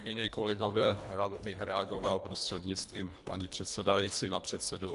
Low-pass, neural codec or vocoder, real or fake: 10.8 kHz; codec, 24 kHz, 1.5 kbps, HILCodec; fake